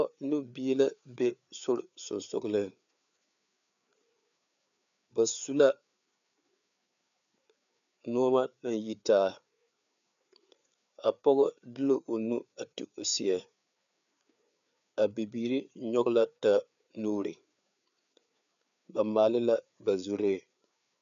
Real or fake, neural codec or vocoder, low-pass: fake; codec, 16 kHz, 4 kbps, FreqCodec, larger model; 7.2 kHz